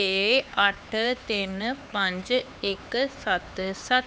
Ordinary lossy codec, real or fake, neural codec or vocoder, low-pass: none; fake; codec, 16 kHz, 4 kbps, X-Codec, HuBERT features, trained on LibriSpeech; none